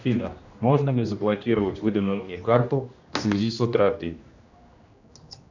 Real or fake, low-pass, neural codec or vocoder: fake; 7.2 kHz; codec, 16 kHz, 1 kbps, X-Codec, HuBERT features, trained on general audio